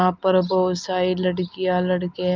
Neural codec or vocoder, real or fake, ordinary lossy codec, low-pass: none; real; Opus, 32 kbps; 7.2 kHz